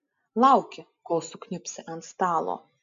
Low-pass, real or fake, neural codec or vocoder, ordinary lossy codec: 7.2 kHz; real; none; MP3, 48 kbps